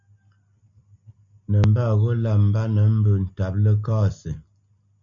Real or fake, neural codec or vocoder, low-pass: real; none; 7.2 kHz